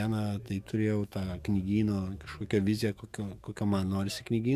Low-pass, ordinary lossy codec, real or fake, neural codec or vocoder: 14.4 kHz; Opus, 64 kbps; fake; autoencoder, 48 kHz, 128 numbers a frame, DAC-VAE, trained on Japanese speech